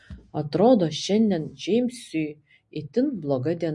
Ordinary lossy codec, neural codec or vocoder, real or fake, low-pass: MP3, 48 kbps; none; real; 10.8 kHz